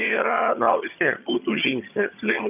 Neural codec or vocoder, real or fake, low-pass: vocoder, 22.05 kHz, 80 mel bands, HiFi-GAN; fake; 3.6 kHz